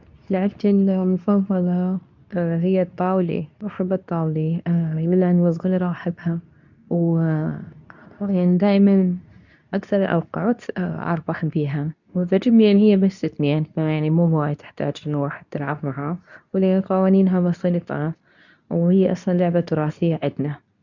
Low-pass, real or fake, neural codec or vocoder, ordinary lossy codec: 7.2 kHz; fake; codec, 24 kHz, 0.9 kbps, WavTokenizer, medium speech release version 2; none